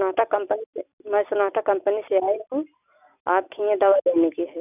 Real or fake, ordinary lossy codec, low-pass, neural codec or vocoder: real; none; 3.6 kHz; none